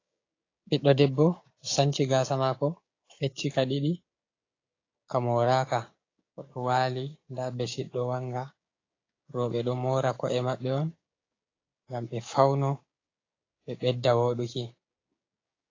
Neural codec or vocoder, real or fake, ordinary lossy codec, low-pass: codec, 16 kHz, 6 kbps, DAC; fake; AAC, 32 kbps; 7.2 kHz